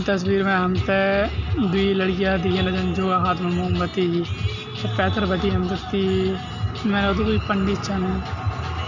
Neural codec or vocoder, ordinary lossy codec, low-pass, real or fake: none; none; 7.2 kHz; real